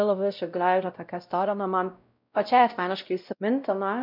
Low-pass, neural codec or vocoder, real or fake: 5.4 kHz; codec, 16 kHz, 0.5 kbps, X-Codec, WavLM features, trained on Multilingual LibriSpeech; fake